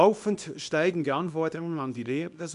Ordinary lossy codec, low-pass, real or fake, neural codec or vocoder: none; 10.8 kHz; fake; codec, 24 kHz, 0.9 kbps, WavTokenizer, small release